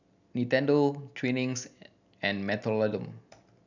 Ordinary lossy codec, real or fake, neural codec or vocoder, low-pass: none; real; none; 7.2 kHz